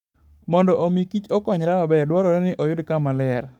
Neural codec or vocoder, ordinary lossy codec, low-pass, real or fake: codec, 44.1 kHz, 7.8 kbps, Pupu-Codec; none; 19.8 kHz; fake